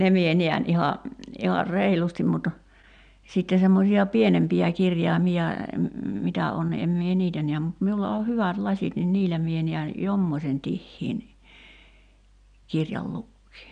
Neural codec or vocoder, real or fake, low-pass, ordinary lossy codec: none; real; 9.9 kHz; none